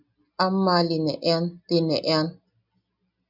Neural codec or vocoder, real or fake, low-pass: none; real; 5.4 kHz